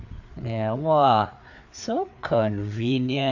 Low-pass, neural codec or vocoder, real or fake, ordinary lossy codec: 7.2 kHz; codec, 16 kHz, 4 kbps, FreqCodec, larger model; fake; none